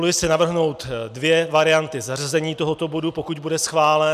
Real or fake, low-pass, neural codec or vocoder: real; 14.4 kHz; none